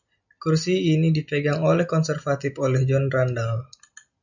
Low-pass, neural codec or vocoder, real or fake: 7.2 kHz; none; real